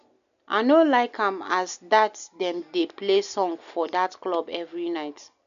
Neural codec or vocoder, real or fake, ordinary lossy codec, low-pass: none; real; none; 7.2 kHz